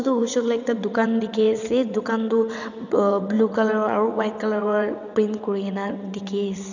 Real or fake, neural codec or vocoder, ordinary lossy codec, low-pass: fake; vocoder, 22.05 kHz, 80 mel bands, WaveNeXt; none; 7.2 kHz